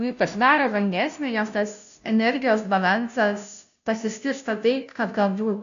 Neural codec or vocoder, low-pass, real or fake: codec, 16 kHz, 0.5 kbps, FunCodec, trained on Chinese and English, 25 frames a second; 7.2 kHz; fake